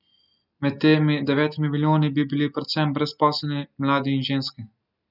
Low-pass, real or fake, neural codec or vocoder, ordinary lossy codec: 5.4 kHz; real; none; none